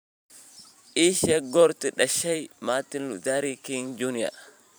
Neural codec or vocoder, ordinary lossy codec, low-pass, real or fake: vocoder, 44.1 kHz, 128 mel bands every 512 samples, BigVGAN v2; none; none; fake